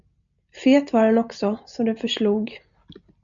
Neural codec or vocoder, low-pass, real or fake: none; 7.2 kHz; real